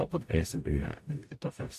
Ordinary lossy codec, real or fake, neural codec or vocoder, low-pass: AAC, 64 kbps; fake; codec, 44.1 kHz, 0.9 kbps, DAC; 14.4 kHz